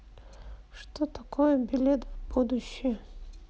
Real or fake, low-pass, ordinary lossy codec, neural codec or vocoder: real; none; none; none